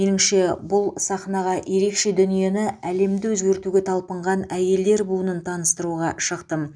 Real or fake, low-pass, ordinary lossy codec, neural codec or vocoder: real; 9.9 kHz; none; none